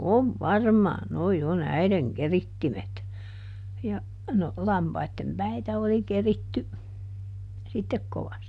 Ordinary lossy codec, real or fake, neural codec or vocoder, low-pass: none; real; none; none